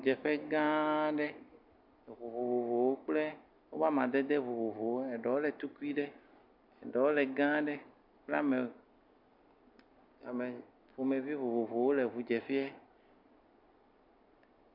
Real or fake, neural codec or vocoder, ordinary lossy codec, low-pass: real; none; MP3, 48 kbps; 5.4 kHz